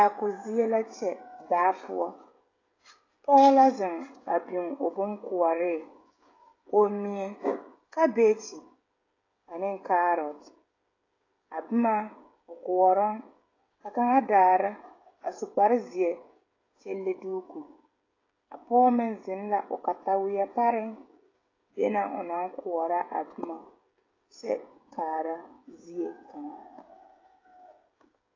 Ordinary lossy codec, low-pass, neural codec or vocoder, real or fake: AAC, 32 kbps; 7.2 kHz; codec, 16 kHz, 16 kbps, FreqCodec, smaller model; fake